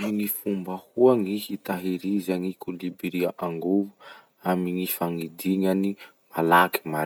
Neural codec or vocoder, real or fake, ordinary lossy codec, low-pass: none; real; none; none